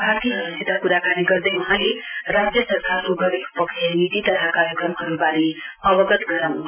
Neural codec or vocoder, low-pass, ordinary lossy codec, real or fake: none; 3.6 kHz; none; real